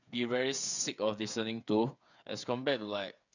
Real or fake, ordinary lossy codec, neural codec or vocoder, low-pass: fake; none; codec, 16 kHz, 8 kbps, FreqCodec, smaller model; 7.2 kHz